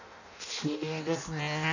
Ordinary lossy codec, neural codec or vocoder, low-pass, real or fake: none; codec, 16 kHz in and 24 kHz out, 0.6 kbps, FireRedTTS-2 codec; 7.2 kHz; fake